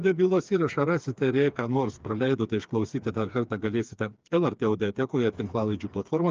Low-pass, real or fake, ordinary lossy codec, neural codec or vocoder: 7.2 kHz; fake; Opus, 24 kbps; codec, 16 kHz, 4 kbps, FreqCodec, smaller model